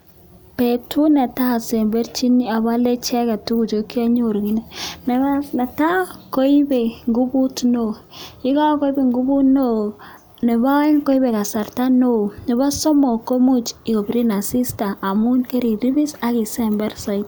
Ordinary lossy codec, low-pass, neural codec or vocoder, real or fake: none; none; none; real